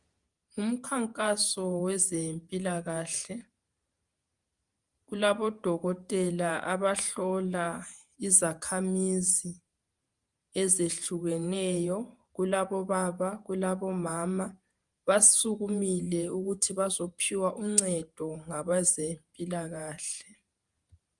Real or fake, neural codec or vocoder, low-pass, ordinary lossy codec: fake; vocoder, 48 kHz, 128 mel bands, Vocos; 10.8 kHz; Opus, 32 kbps